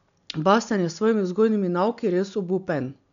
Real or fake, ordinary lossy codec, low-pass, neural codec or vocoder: real; none; 7.2 kHz; none